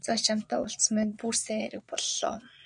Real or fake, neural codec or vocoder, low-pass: fake; vocoder, 22.05 kHz, 80 mel bands, Vocos; 9.9 kHz